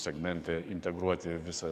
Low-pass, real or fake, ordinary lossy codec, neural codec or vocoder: 14.4 kHz; fake; MP3, 96 kbps; codec, 44.1 kHz, 7.8 kbps, DAC